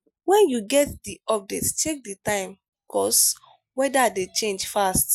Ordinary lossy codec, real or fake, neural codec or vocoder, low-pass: none; real; none; none